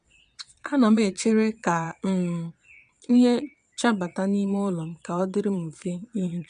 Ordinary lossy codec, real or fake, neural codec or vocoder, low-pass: MP3, 96 kbps; fake; vocoder, 22.05 kHz, 80 mel bands, Vocos; 9.9 kHz